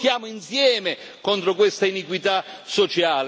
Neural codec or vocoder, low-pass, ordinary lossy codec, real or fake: none; none; none; real